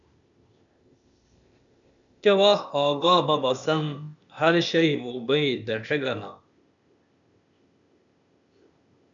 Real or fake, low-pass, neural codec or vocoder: fake; 7.2 kHz; codec, 16 kHz, 0.8 kbps, ZipCodec